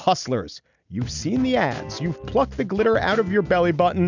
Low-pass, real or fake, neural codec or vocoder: 7.2 kHz; real; none